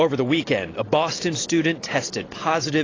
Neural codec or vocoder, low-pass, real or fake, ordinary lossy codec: none; 7.2 kHz; real; AAC, 32 kbps